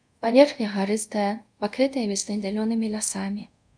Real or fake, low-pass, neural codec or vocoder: fake; 9.9 kHz; codec, 24 kHz, 0.5 kbps, DualCodec